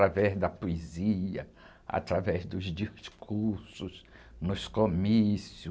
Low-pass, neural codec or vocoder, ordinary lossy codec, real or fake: none; none; none; real